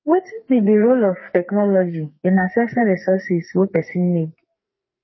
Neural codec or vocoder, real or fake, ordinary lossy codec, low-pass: codec, 44.1 kHz, 2.6 kbps, SNAC; fake; MP3, 24 kbps; 7.2 kHz